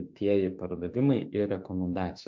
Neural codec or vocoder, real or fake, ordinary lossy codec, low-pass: autoencoder, 48 kHz, 32 numbers a frame, DAC-VAE, trained on Japanese speech; fake; MP3, 48 kbps; 7.2 kHz